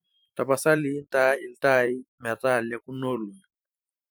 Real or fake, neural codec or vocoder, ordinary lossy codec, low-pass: fake; vocoder, 44.1 kHz, 128 mel bands every 512 samples, BigVGAN v2; none; none